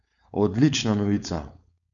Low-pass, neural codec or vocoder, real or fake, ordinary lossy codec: 7.2 kHz; codec, 16 kHz, 4.8 kbps, FACodec; fake; none